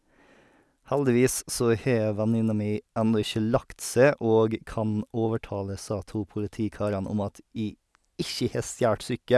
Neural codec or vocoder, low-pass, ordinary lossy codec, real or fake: none; none; none; real